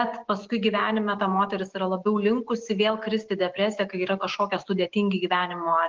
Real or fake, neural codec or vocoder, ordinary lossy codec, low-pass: real; none; Opus, 16 kbps; 7.2 kHz